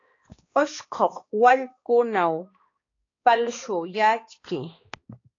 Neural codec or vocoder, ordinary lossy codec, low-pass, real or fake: codec, 16 kHz, 2 kbps, X-Codec, HuBERT features, trained on balanced general audio; AAC, 32 kbps; 7.2 kHz; fake